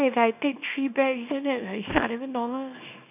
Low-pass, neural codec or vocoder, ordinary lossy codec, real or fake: 3.6 kHz; codec, 24 kHz, 0.9 kbps, WavTokenizer, small release; none; fake